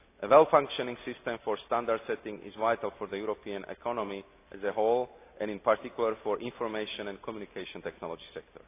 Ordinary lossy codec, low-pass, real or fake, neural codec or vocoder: AAC, 24 kbps; 3.6 kHz; real; none